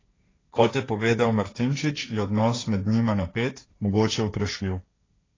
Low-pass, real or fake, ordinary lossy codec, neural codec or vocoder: 7.2 kHz; fake; AAC, 32 kbps; codec, 16 kHz, 1.1 kbps, Voila-Tokenizer